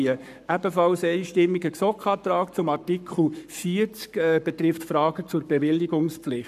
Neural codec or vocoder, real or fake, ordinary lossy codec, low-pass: codec, 44.1 kHz, 7.8 kbps, Pupu-Codec; fake; none; 14.4 kHz